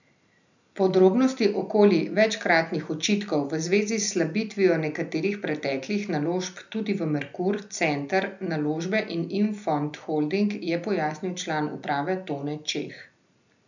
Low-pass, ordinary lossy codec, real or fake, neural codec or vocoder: 7.2 kHz; none; real; none